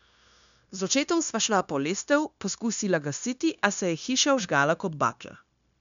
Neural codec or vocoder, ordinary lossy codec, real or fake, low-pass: codec, 16 kHz, 0.9 kbps, LongCat-Audio-Codec; none; fake; 7.2 kHz